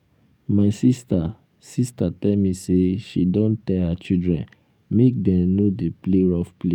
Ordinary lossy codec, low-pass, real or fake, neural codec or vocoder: none; 19.8 kHz; fake; codec, 44.1 kHz, 7.8 kbps, DAC